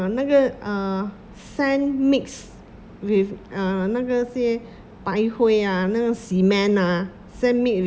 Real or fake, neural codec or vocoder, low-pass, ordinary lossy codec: real; none; none; none